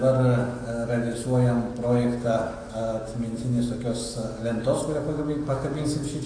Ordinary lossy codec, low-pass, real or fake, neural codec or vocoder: AAC, 32 kbps; 9.9 kHz; fake; autoencoder, 48 kHz, 128 numbers a frame, DAC-VAE, trained on Japanese speech